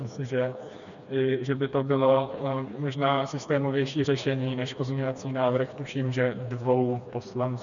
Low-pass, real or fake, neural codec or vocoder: 7.2 kHz; fake; codec, 16 kHz, 2 kbps, FreqCodec, smaller model